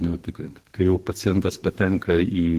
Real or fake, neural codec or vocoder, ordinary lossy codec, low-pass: fake; codec, 32 kHz, 1.9 kbps, SNAC; Opus, 16 kbps; 14.4 kHz